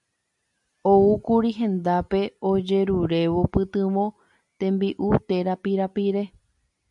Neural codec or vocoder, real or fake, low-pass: none; real; 10.8 kHz